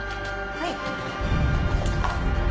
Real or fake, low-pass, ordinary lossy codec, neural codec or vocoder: real; none; none; none